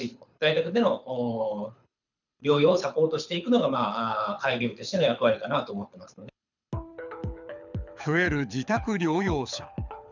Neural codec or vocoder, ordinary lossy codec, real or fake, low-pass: codec, 24 kHz, 6 kbps, HILCodec; none; fake; 7.2 kHz